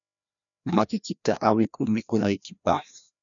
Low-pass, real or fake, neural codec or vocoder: 7.2 kHz; fake; codec, 16 kHz, 1 kbps, FreqCodec, larger model